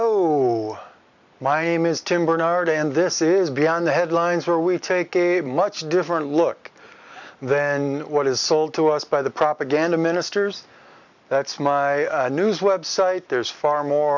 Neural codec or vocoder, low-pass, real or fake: none; 7.2 kHz; real